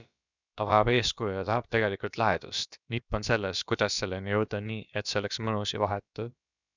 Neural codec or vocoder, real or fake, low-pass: codec, 16 kHz, about 1 kbps, DyCAST, with the encoder's durations; fake; 7.2 kHz